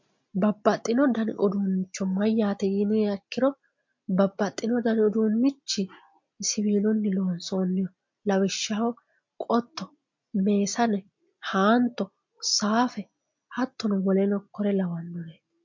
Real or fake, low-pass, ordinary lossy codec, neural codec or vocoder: real; 7.2 kHz; MP3, 48 kbps; none